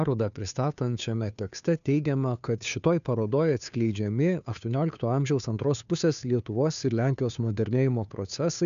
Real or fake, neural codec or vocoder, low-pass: fake; codec, 16 kHz, 2 kbps, FunCodec, trained on Chinese and English, 25 frames a second; 7.2 kHz